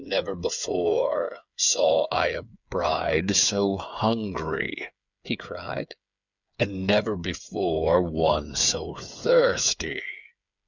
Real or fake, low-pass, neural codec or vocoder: fake; 7.2 kHz; codec, 16 kHz, 8 kbps, FreqCodec, smaller model